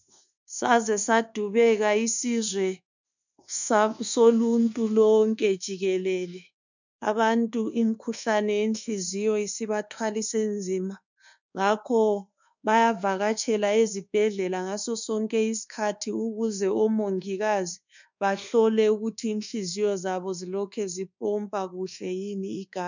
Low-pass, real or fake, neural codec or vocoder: 7.2 kHz; fake; codec, 24 kHz, 1.2 kbps, DualCodec